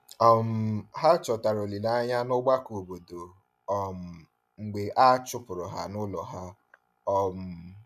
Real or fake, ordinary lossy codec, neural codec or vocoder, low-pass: real; none; none; 14.4 kHz